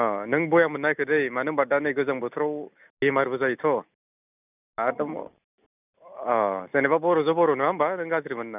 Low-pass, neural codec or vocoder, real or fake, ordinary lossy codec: 3.6 kHz; none; real; none